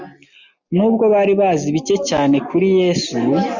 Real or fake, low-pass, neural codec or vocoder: real; 7.2 kHz; none